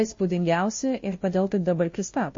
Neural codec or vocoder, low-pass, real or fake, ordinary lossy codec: codec, 16 kHz, 0.5 kbps, FunCodec, trained on Chinese and English, 25 frames a second; 7.2 kHz; fake; MP3, 32 kbps